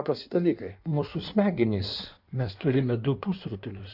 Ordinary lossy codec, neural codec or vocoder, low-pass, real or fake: AAC, 48 kbps; codec, 16 kHz in and 24 kHz out, 1.1 kbps, FireRedTTS-2 codec; 5.4 kHz; fake